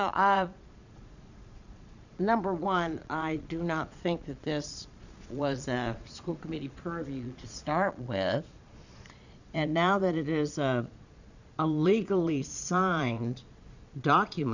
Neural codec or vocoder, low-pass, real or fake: vocoder, 22.05 kHz, 80 mel bands, Vocos; 7.2 kHz; fake